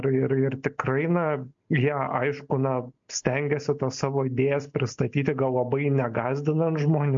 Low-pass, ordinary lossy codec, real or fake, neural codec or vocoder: 7.2 kHz; MP3, 48 kbps; real; none